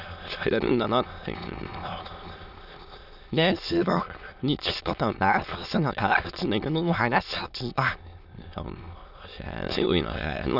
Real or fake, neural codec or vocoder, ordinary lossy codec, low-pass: fake; autoencoder, 22.05 kHz, a latent of 192 numbers a frame, VITS, trained on many speakers; none; 5.4 kHz